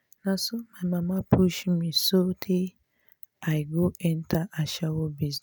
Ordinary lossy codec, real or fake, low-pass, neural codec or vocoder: none; real; none; none